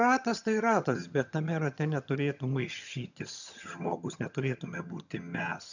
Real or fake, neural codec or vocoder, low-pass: fake; vocoder, 22.05 kHz, 80 mel bands, HiFi-GAN; 7.2 kHz